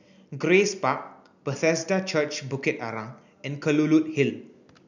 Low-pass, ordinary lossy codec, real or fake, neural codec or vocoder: 7.2 kHz; none; real; none